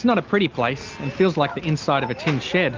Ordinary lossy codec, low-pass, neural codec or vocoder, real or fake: Opus, 24 kbps; 7.2 kHz; none; real